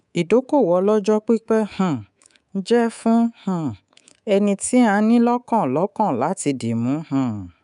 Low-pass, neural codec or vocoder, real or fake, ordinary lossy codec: 10.8 kHz; codec, 24 kHz, 3.1 kbps, DualCodec; fake; none